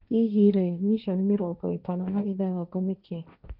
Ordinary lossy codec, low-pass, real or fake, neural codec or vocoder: none; 5.4 kHz; fake; codec, 16 kHz, 1.1 kbps, Voila-Tokenizer